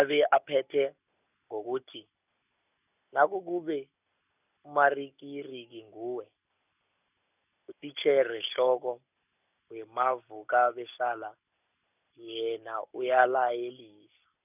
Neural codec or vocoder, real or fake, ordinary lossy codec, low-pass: none; real; none; 3.6 kHz